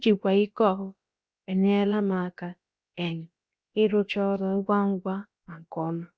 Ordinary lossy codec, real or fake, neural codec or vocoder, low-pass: none; fake; codec, 16 kHz, about 1 kbps, DyCAST, with the encoder's durations; none